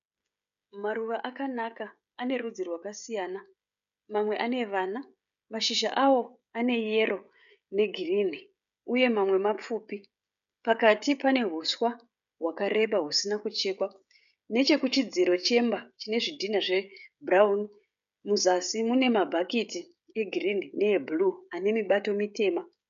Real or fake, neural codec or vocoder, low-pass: fake; codec, 16 kHz, 16 kbps, FreqCodec, smaller model; 7.2 kHz